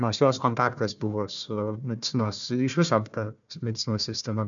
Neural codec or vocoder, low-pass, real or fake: codec, 16 kHz, 1 kbps, FunCodec, trained on Chinese and English, 50 frames a second; 7.2 kHz; fake